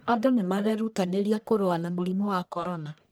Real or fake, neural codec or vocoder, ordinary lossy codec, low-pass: fake; codec, 44.1 kHz, 1.7 kbps, Pupu-Codec; none; none